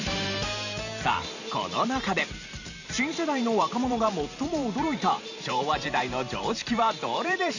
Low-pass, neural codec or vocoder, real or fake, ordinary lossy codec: 7.2 kHz; vocoder, 44.1 kHz, 128 mel bands every 512 samples, BigVGAN v2; fake; none